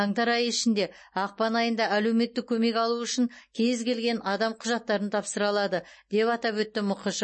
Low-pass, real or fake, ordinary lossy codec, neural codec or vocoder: 9.9 kHz; real; MP3, 32 kbps; none